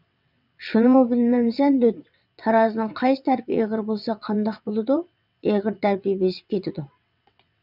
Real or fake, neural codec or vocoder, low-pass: fake; vocoder, 22.05 kHz, 80 mel bands, WaveNeXt; 5.4 kHz